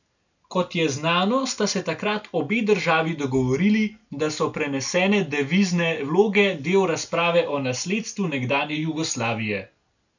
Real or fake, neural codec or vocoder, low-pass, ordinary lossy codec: real; none; 7.2 kHz; none